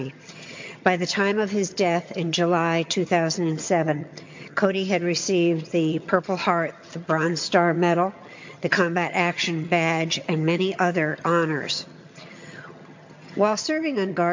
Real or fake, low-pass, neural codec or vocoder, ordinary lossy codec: fake; 7.2 kHz; vocoder, 22.05 kHz, 80 mel bands, HiFi-GAN; MP3, 48 kbps